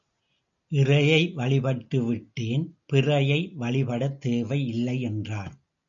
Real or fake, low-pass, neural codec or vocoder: real; 7.2 kHz; none